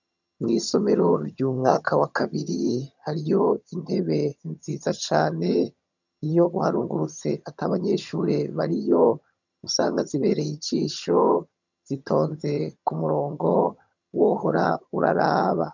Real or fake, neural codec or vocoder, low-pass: fake; vocoder, 22.05 kHz, 80 mel bands, HiFi-GAN; 7.2 kHz